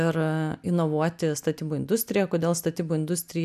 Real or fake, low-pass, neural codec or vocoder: real; 14.4 kHz; none